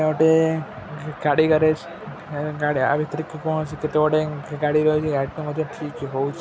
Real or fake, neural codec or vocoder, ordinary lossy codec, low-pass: real; none; none; none